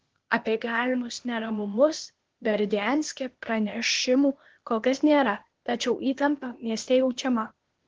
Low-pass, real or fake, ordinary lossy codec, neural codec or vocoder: 7.2 kHz; fake; Opus, 16 kbps; codec, 16 kHz, 0.8 kbps, ZipCodec